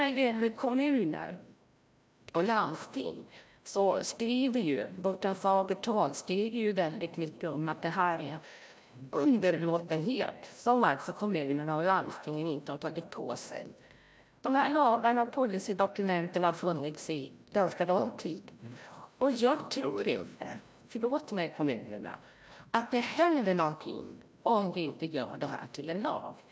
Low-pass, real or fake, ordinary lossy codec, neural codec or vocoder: none; fake; none; codec, 16 kHz, 0.5 kbps, FreqCodec, larger model